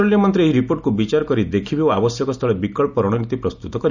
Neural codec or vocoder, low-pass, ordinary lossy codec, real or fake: none; 7.2 kHz; none; real